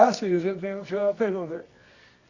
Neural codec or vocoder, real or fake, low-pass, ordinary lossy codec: codec, 24 kHz, 0.9 kbps, WavTokenizer, medium music audio release; fake; 7.2 kHz; Opus, 64 kbps